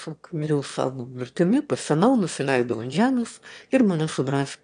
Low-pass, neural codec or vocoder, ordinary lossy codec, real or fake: 9.9 kHz; autoencoder, 22.05 kHz, a latent of 192 numbers a frame, VITS, trained on one speaker; AAC, 96 kbps; fake